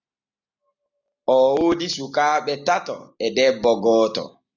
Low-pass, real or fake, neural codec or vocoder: 7.2 kHz; real; none